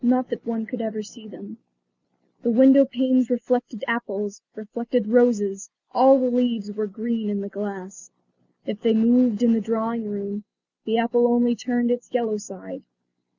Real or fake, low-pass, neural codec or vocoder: real; 7.2 kHz; none